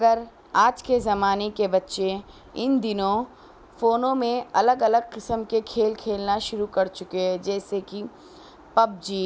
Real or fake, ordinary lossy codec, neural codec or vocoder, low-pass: real; none; none; none